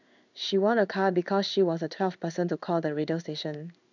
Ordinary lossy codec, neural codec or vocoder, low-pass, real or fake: none; codec, 16 kHz in and 24 kHz out, 1 kbps, XY-Tokenizer; 7.2 kHz; fake